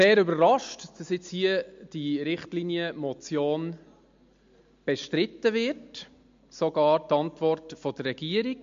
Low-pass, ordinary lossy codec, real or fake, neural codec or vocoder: 7.2 kHz; MP3, 48 kbps; real; none